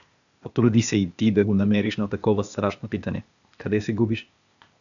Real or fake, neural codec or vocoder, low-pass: fake; codec, 16 kHz, 0.8 kbps, ZipCodec; 7.2 kHz